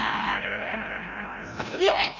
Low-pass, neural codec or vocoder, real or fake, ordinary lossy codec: 7.2 kHz; codec, 16 kHz, 0.5 kbps, FreqCodec, larger model; fake; Opus, 64 kbps